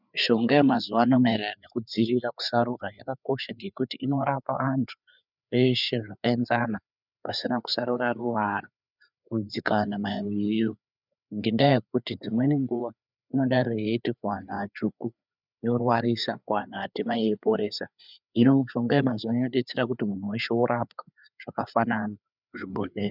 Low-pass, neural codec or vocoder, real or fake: 5.4 kHz; codec, 16 kHz, 4 kbps, FreqCodec, larger model; fake